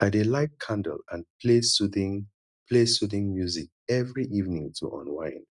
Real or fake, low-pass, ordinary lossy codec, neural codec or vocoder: real; 10.8 kHz; none; none